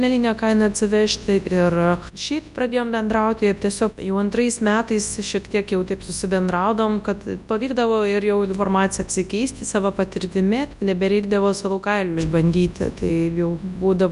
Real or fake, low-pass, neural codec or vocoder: fake; 10.8 kHz; codec, 24 kHz, 0.9 kbps, WavTokenizer, large speech release